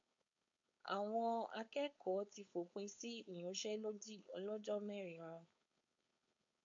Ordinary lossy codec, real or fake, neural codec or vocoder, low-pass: MP3, 48 kbps; fake; codec, 16 kHz, 4.8 kbps, FACodec; 7.2 kHz